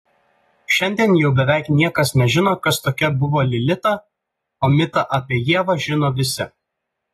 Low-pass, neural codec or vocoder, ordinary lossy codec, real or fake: 19.8 kHz; none; AAC, 32 kbps; real